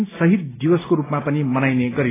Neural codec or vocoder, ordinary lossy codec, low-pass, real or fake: none; AAC, 16 kbps; 3.6 kHz; real